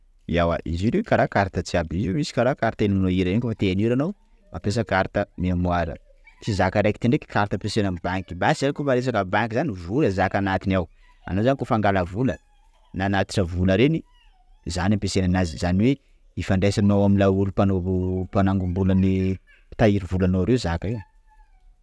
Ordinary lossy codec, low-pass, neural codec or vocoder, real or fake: none; none; none; real